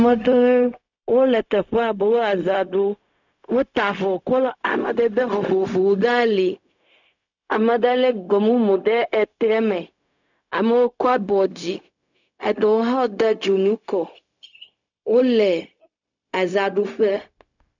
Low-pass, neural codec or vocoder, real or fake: 7.2 kHz; codec, 16 kHz, 0.4 kbps, LongCat-Audio-Codec; fake